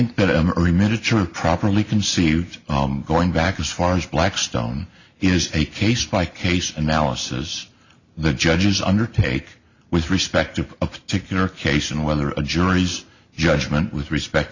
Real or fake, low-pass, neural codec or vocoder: real; 7.2 kHz; none